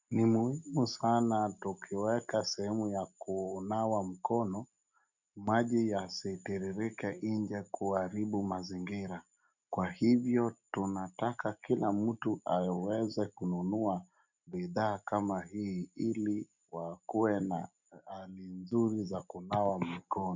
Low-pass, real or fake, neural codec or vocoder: 7.2 kHz; real; none